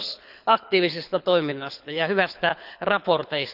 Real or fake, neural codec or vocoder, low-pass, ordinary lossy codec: fake; codec, 24 kHz, 6 kbps, HILCodec; 5.4 kHz; none